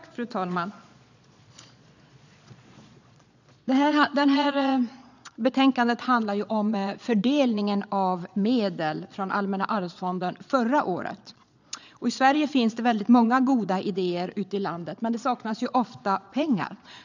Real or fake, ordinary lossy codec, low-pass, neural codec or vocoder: fake; none; 7.2 kHz; vocoder, 22.05 kHz, 80 mel bands, Vocos